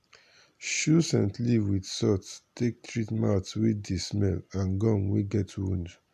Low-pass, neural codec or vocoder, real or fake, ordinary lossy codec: 14.4 kHz; none; real; AAC, 96 kbps